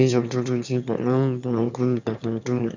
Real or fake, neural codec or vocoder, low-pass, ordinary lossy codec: fake; autoencoder, 22.05 kHz, a latent of 192 numbers a frame, VITS, trained on one speaker; 7.2 kHz; none